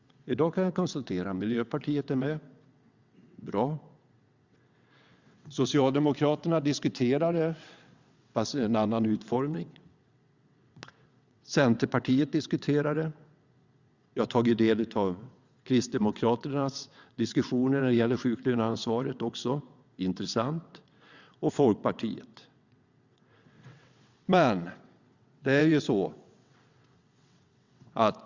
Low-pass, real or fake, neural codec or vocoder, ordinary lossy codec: 7.2 kHz; fake; vocoder, 22.05 kHz, 80 mel bands, WaveNeXt; Opus, 64 kbps